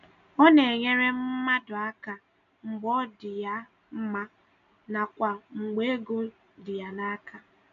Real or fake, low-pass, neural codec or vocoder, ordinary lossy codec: real; 7.2 kHz; none; none